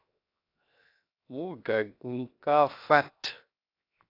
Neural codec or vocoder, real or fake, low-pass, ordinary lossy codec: codec, 16 kHz, 0.7 kbps, FocalCodec; fake; 5.4 kHz; AAC, 32 kbps